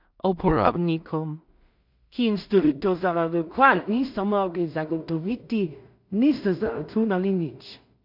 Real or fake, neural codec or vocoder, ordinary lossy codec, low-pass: fake; codec, 16 kHz in and 24 kHz out, 0.4 kbps, LongCat-Audio-Codec, two codebook decoder; none; 5.4 kHz